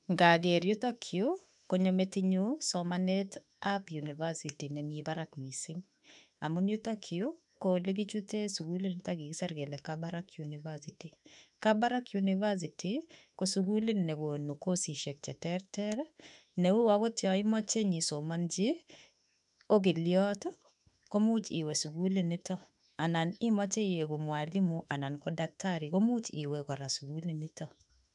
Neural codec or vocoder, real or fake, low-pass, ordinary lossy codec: autoencoder, 48 kHz, 32 numbers a frame, DAC-VAE, trained on Japanese speech; fake; 10.8 kHz; none